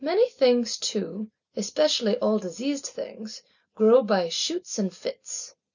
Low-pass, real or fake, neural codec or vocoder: 7.2 kHz; real; none